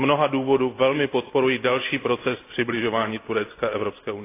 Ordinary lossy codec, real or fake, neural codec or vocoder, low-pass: AAC, 24 kbps; real; none; 3.6 kHz